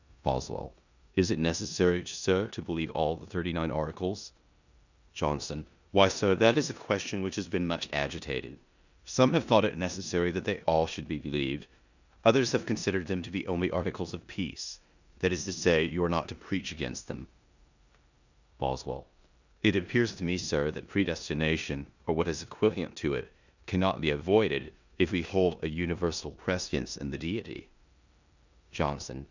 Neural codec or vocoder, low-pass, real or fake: codec, 16 kHz in and 24 kHz out, 0.9 kbps, LongCat-Audio-Codec, four codebook decoder; 7.2 kHz; fake